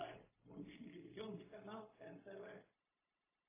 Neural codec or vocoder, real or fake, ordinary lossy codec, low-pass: codec, 16 kHz, 0.4 kbps, LongCat-Audio-Codec; fake; AAC, 16 kbps; 3.6 kHz